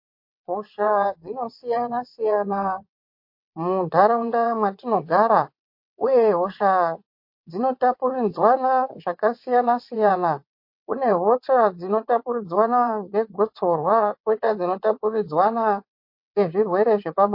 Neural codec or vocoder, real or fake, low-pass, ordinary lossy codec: vocoder, 22.05 kHz, 80 mel bands, WaveNeXt; fake; 5.4 kHz; MP3, 32 kbps